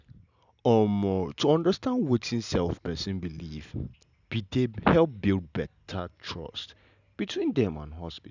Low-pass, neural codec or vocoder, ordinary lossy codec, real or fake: 7.2 kHz; none; none; real